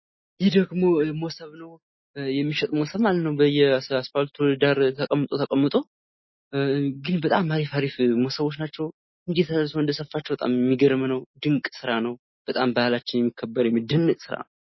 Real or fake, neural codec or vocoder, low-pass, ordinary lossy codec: real; none; 7.2 kHz; MP3, 24 kbps